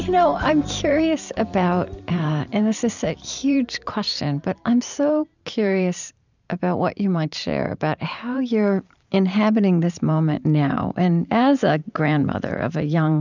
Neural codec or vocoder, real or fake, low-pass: vocoder, 44.1 kHz, 128 mel bands every 512 samples, BigVGAN v2; fake; 7.2 kHz